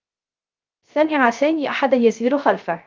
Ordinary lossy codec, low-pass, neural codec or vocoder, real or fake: Opus, 24 kbps; 7.2 kHz; codec, 16 kHz, 0.3 kbps, FocalCodec; fake